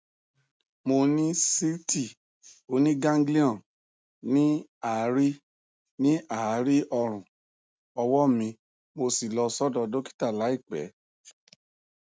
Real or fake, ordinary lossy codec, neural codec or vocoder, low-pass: real; none; none; none